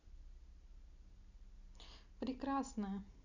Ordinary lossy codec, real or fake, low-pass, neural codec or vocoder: none; real; 7.2 kHz; none